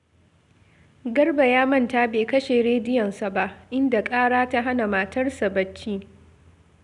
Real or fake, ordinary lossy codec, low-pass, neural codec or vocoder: real; none; 10.8 kHz; none